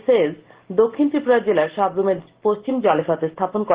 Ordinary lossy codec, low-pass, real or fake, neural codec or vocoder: Opus, 16 kbps; 3.6 kHz; real; none